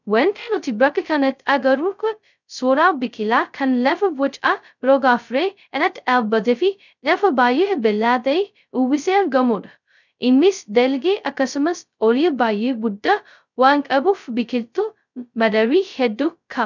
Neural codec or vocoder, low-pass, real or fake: codec, 16 kHz, 0.2 kbps, FocalCodec; 7.2 kHz; fake